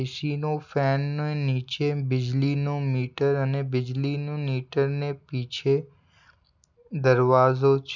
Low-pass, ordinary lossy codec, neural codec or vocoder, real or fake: 7.2 kHz; none; none; real